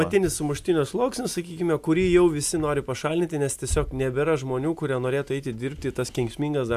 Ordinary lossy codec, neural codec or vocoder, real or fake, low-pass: AAC, 96 kbps; none; real; 14.4 kHz